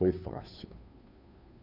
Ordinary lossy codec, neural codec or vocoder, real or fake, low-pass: none; codec, 16 kHz, 2 kbps, FunCodec, trained on Chinese and English, 25 frames a second; fake; 5.4 kHz